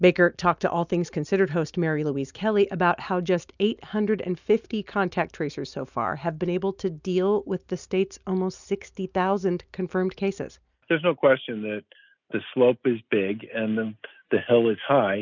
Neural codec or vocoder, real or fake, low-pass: none; real; 7.2 kHz